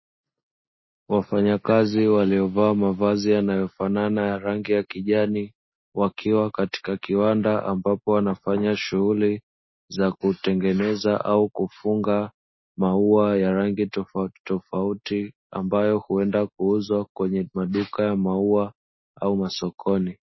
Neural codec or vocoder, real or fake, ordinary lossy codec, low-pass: none; real; MP3, 24 kbps; 7.2 kHz